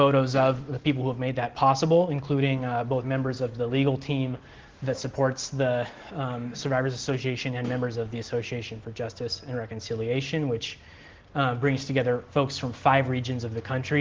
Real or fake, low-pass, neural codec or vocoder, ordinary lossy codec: real; 7.2 kHz; none; Opus, 16 kbps